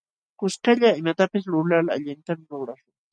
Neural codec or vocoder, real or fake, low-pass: none; real; 9.9 kHz